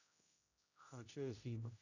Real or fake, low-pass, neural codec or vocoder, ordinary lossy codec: fake; 7.2 kHz; codec, 16 kHz, 0.5 kbps, X-Codec, HuBERT features, trained on general audio; none